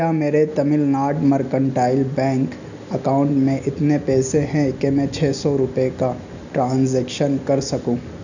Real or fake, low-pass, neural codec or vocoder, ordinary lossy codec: real; 7.2 kHz; none; none